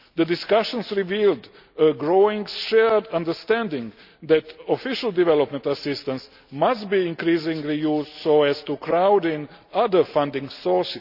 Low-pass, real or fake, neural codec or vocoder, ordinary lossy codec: 5.4 kHz; real; none; none